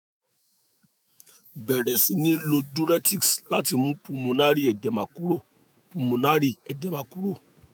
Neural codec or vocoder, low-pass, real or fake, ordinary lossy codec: autoencoder, 48 kHz, 128 numbers a frame, DAC-VAE, trained on Japanese speech; none; fake; none